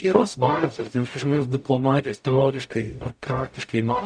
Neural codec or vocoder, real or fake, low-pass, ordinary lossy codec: codec, 44.1 kHz, 0.9 kbps, DAC; fake; 9.9 kHz; MP3, 96 kbps